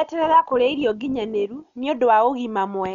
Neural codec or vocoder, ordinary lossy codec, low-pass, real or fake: none; none; 7.2 kHz; real